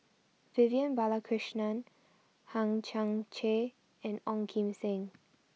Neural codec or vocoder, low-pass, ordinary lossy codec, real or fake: none; none; none; real